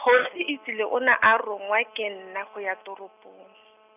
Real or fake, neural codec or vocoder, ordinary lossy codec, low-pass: real; none; none; 3.6 kHz